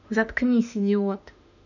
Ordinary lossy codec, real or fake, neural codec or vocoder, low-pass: none; fake; autoencoder, 48 kHz, 32 numbers a frame, DAC-VAE, trained on Japanese speech; 7.2 kHz